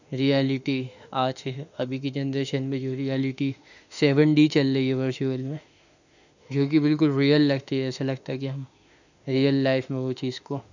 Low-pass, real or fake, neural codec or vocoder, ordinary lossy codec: 7.2 kHz; fake; autoencoder, 48 kHz, 32 numbers a frame, DAC-VAE, trained on Japanese speech; none